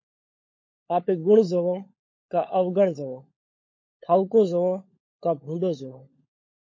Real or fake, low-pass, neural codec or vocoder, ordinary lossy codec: fake; 7.2 kHz; codec, 16 kHz, 16 kbps, FunCodec, trained on LibriTTS, 50 frames a second; MP3, 32 kbps